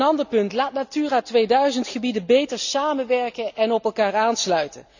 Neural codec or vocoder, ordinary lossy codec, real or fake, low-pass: none; none; real; 7.2 kHz